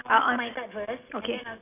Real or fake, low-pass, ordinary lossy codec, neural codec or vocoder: real; 3.6 kHz; none; none